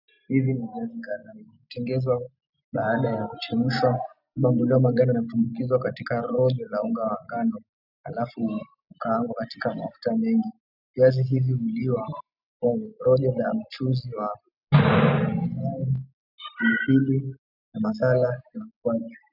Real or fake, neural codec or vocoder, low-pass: real; none; 5.4 kHz